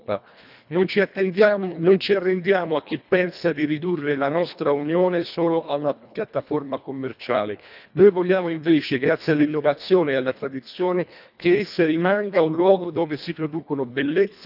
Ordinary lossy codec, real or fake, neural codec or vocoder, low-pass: none; fake; codec, 24 kHz, 1.5 kbps, HILCodec; 5.4 kHz